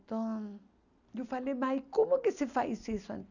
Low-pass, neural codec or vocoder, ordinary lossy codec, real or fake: 7.2 kHz; none; none; real